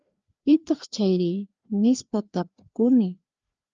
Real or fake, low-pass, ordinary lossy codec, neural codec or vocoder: fake; 7.2 kHz; Opus, 24 kbps; codec, 16 kHz, 2 kbps, FreqCodec, larger model